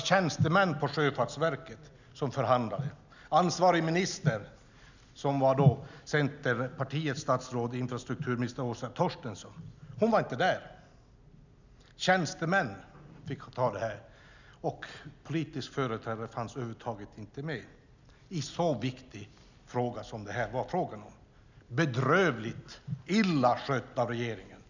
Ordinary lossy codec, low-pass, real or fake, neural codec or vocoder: none; 7.2 kHz; real; none